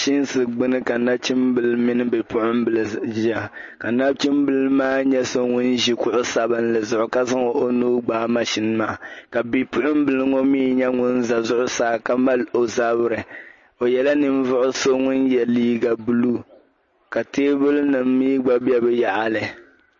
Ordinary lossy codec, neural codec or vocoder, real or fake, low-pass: MP3, 32 kbps; none; real; 7.2 kHz